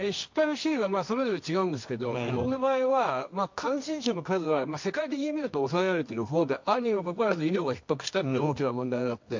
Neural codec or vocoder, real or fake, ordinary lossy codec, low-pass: codec, 24 kHz, 0.9 kbps, WavTokenizer, medium music audio release; fake; MP3, 48 kbps; 7.2 kHz